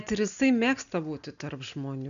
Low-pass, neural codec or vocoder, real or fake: 7.2 kHz; none; real